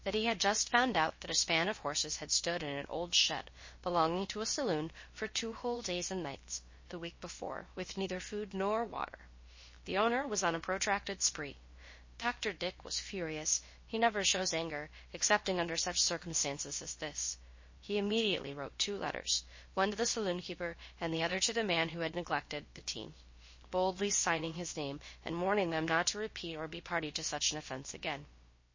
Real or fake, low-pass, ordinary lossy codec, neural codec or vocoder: fake; 7.2 kHz; MP3, 32 kbps; codec, 16 kHz, about 1 kbps, DyCAST, with the encoder's durations